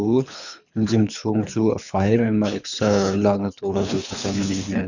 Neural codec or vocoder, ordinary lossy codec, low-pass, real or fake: codec, 24 kHz, 3 kbps, HILCodec; none; 7.2 kHz; fake